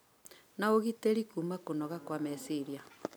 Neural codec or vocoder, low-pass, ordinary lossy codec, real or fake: none; none; none; real